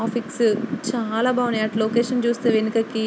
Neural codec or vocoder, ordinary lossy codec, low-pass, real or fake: none; none; none; real